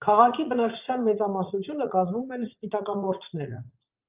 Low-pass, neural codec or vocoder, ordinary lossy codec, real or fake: 3.6 kHz; vocoder, 44.1 kHz, 80 mel bands, Vocos; Opus, 32 kbps; fake